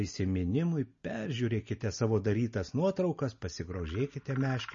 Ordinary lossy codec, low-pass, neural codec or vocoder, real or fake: MP3, 32 kbps; 7.2 kHz; none; real